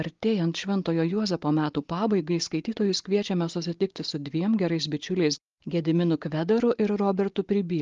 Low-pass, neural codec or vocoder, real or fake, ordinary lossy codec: 7.2 kHz; none; real; Opus, 32 kbps